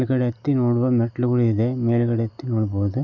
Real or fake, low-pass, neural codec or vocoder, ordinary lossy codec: real; 7.2 kHz; none; none